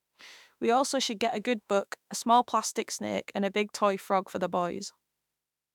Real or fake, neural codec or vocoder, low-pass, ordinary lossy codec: fake; autoencoder, 48 kHz, 32 numbers a frame, DAC-VAE, trained on Japanese speech; 19.8 kHz; none